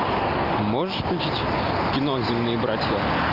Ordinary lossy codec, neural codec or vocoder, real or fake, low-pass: Opus, 32 kbps; none; real; 5.4 kHz